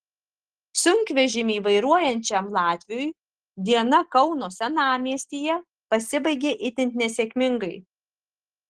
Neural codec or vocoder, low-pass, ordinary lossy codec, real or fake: none; 10.8 kHz; Opus, 16 kbps; real